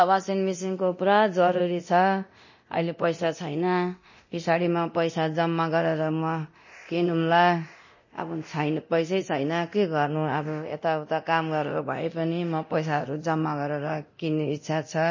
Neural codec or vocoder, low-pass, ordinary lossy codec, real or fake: codec, 24 kHz, 0.9 kbps, DualCodec; 7.2 kHz; MP3, 32 kbps; fake